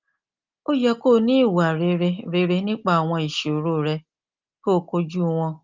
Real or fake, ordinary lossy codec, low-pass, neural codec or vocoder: real; Opus, 24 kbps; 7.2 kHz; none